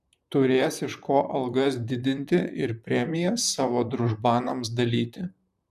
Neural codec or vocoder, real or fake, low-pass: vocoder, 44.1 kHz, 128 mel bands, Pupu-Vocoder; fake; 14.4 kHz